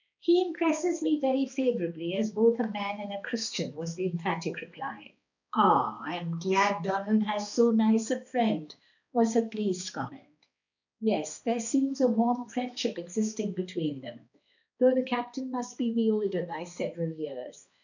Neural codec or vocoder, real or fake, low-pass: codec, 16 kHz, 2 kbps, X-Codec, HuBERT features, trained on balanced general audio; fake; 7.2 kHz